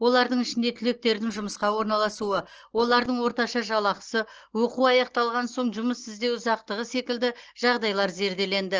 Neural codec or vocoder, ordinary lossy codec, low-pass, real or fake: none; Opus, 16 kbps; 7.2 kHz; real